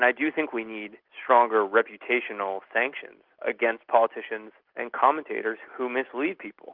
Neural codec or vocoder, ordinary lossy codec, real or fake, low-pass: none; Opus, 32 kbps; real; 5.4 kHz